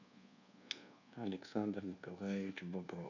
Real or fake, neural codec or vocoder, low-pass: fake; codec, 24 kHz, 1.2 kbps, DualCodec; 7.2 kHz